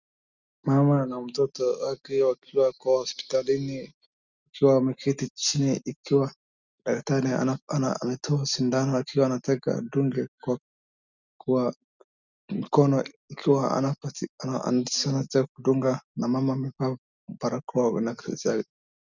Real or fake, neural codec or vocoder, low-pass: real; none; 7.2 kHz